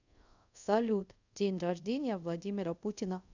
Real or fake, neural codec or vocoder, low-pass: fake; codec, 24 kHz, 0.5 kbps, DualCodec; 7.2 kHz